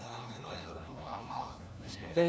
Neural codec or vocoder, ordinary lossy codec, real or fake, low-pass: codec, 16 kHz, 1 kbps, FunCodec, trained on LibriTTS, 50 frames a second; none; fake; none